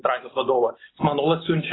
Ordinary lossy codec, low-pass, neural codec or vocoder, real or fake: AAC, 16 kbps; 7.2 kHz; none; real